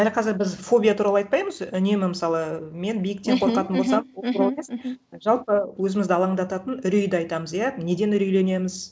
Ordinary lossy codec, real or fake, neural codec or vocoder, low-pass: none; real; none; none